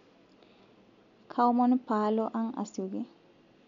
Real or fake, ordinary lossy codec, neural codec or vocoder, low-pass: real; none; none; 7.2 kHz